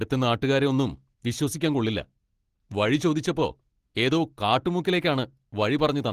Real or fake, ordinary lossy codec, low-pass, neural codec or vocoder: real; Opus, 16 kbps; 14.4 kHz; none